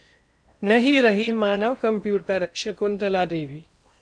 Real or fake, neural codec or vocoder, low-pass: fake; codec, 16 kHz in and 24 kHz out, 0.8 kbps, FocalCodec, streaming, 65536 codes; 9.9 kHz